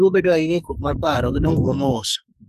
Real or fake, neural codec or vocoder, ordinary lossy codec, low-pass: fake; codec, 44.1 kHz, 2.6 kbps, SNAC; none; 14.4 kHz